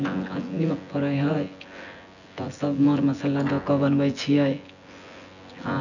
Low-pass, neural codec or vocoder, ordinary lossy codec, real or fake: 7.2 kHz; vocoder, 24 kHz, 100 mel bands, Vocos; none; fake